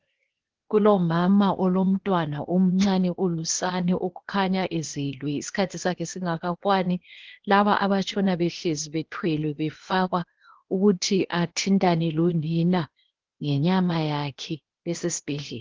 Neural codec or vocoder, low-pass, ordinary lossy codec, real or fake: codec, 16 kHz, 0.8 kbps, ZipCodec; 7.2 kHz; Opus, 16 kbps; fake